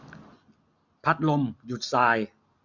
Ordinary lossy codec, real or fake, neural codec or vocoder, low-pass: none; real; none; 7.2 kHz